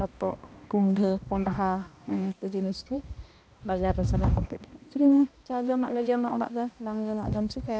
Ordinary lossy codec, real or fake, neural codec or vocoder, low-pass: none; fake; codec, 16 kHz, 1 kbps, X-Codec, HuBERT features, trained on balanced general audio; none